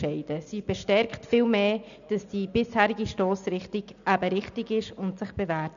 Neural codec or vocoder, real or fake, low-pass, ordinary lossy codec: none; real; 7.2 kHz; none